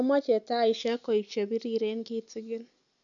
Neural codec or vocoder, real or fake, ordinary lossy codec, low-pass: none; real; none; 7.2 kHz